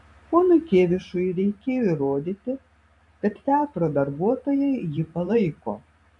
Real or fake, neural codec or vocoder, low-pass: fake; vocoder, 44.1 kHz, 128 mel bands every 512 samples, BigVGAN v2; 10.8 kHz